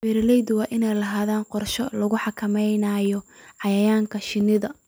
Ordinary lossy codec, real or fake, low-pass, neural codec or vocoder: none; real; none; none